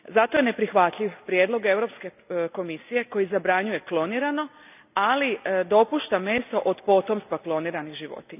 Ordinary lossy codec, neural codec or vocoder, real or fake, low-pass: none; none; real; 3.6 kHz